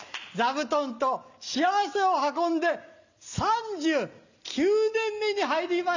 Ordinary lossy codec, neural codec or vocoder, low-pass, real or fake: none; none; 7.2 kHz; real